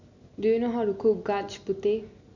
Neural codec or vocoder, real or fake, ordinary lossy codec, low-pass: none; real; none; 7.2 kHz